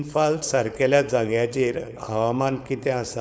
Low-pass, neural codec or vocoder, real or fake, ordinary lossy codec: none; codec, 16 kHz, 4.8 kbps, FACodec; fake; none